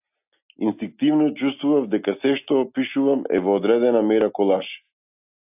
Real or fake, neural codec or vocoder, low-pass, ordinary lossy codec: real; none; 3.6 kHz; AAC, 32 kbps